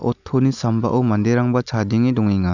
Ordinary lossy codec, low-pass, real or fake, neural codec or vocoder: none; 7.2 kHz; real; none